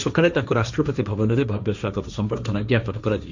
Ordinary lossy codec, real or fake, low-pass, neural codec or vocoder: none; fake; 7.2 kHz; codec, 16 kHz, 1.1 kbps, Voila-Tokenizer